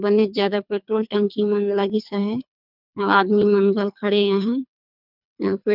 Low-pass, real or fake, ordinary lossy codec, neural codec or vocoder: 5.4 kHz; fake; none; codec, 24 kHz, 3 kbps, HILCodec